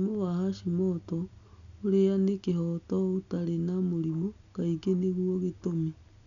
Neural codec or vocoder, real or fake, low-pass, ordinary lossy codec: none; real; 7.2 kHz; none